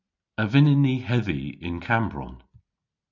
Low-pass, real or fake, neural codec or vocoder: 7.2 kHz; real; none